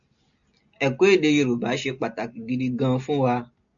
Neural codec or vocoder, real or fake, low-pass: none; real; 7.2 kHz